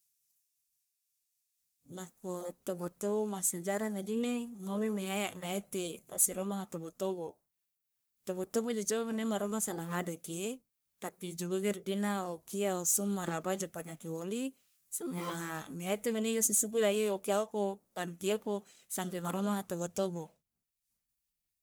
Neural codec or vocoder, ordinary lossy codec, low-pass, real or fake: codec, 44.1 kHz, 1.7 kbps, Pupu-Codec; none; none; fake